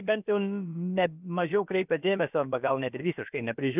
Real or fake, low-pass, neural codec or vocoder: fake; 3.6 kHz; codec, 16 kHz, about 1 kbps, DyCAST, with the encoder's durations